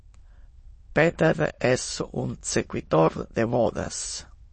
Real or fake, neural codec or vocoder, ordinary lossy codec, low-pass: fake; autoencoder, 22.05 kHz, a latent of 192 numbers a frame, VITS, trained on many speakers; MP3, 32 kbps; 9.9 kHz